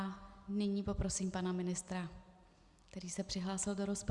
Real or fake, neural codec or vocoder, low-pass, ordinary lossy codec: real; none; 10.8 kHz; Opus, 64 kbps